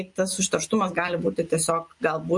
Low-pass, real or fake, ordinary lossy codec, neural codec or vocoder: 10.8 kHz; real; MP3, 48 kbps; none